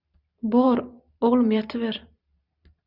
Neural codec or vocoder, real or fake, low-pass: vocoder, 44.1 kHz, 128 mel bands every 512 samples, BigVGAN v2; fake; 5.4 kHz